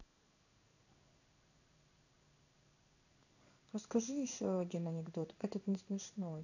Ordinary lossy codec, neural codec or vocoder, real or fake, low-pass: none; codec, 16 kHz in and 24 kHz out, 1 kbps, XY-Tokenizer; fake; 7.2 kHz